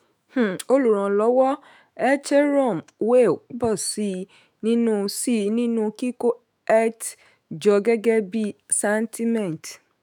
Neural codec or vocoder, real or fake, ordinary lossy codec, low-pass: autoencoder, 48 kHz, 128 numbers a frame, DAC-VAE, trained on Japanese speech; fake; none; none